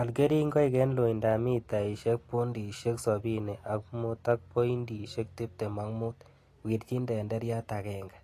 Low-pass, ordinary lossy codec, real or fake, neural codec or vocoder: 14.4 kHz; AAC, 48 kbps; real; none